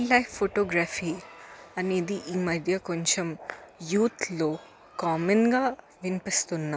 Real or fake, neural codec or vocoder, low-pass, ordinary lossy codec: real; none; none; none